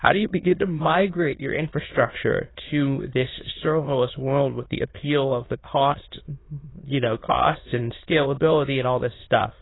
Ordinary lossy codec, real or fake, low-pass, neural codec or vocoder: AAC, 16 kbps; fake; 7.2 kHz; autoencoder, 22.05 kHz, a latent of 192 numbers a frame, VITS, trained on many speakers